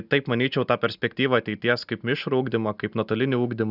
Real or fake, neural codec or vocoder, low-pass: real; none; 5.4 kHz